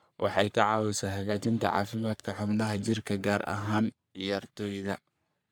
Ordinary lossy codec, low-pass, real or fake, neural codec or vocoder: none; none; fake; codec, 44.1 kHz, 3.4 kbps, Pupu-Codec